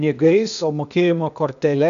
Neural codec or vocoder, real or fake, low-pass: codec, 16 kHz, 0.8 kbps, ZipCodec; fake; 7.2 kHz